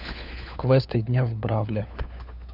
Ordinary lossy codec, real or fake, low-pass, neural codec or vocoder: none; fake; 5.4 kHz; codec, 16 kHz, 4 kbps, FunCodec, trained on LibriTTS, 50 frames a second